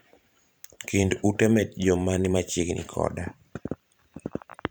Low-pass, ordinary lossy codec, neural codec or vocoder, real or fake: none; none; vocoder, 44.1 kHz, 128 mel bands every 512 samples, BigVGAN v2; fake